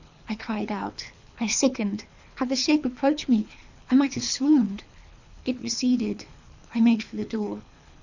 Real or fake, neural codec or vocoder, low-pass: fake; codec, 24 kHz, 3 kbps, HILCodec; 7.2 kHz